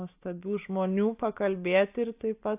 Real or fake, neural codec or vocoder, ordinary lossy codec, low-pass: real; none; AAC, 32 kbps; 3.6 kHz